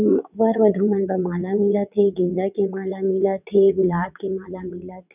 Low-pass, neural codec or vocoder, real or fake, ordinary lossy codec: 3.6 kHz; vocoder, 22.05 kHz, 80 mel bands, WaveNeXt; fake; none